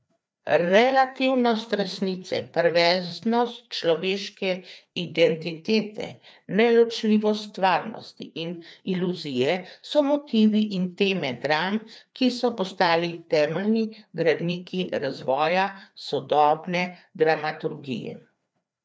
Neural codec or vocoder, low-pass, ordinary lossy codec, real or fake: codec, 16 kHz, 2 kbps, FreqCodec, larger model; none; none; fake